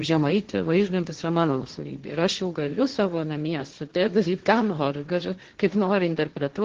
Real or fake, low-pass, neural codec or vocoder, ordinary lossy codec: fake; 7.2 kHz; codec, 16 kHz, 1.1 kbps, Voila-Tokenizer; Opus, 16 kbps